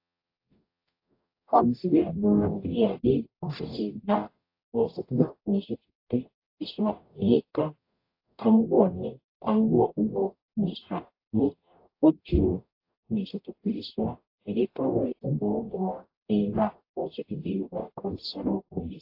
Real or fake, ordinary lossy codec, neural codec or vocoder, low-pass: fake; AAC, 32 kbps; codec, 44.1 kHz, 0.9 kbps, DAC; 5.4 kHz